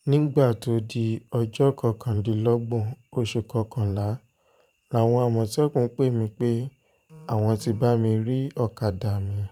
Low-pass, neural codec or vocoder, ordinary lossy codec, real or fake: 19.8 kHz; vocoder, 44.1 kHz, 128 mel bands every 512 samples, BigVGAN v2; none; fake